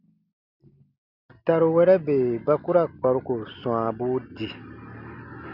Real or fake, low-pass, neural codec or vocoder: real; 5.4 kHz; none